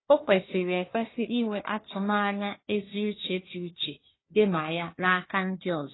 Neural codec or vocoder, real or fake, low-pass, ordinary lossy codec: codec, 16 kHz, 1 kbps, FunCodec, trained on Chinese and English, 50 frames a second; fake; 7.2 kHz; AAC, 16 kbps